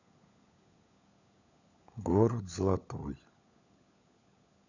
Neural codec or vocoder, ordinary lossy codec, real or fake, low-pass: codec, 16 kHz, 16 kbps, FunCodec, trained on LibriTTS, 50 frames a second; none; fake; 7.2 kHz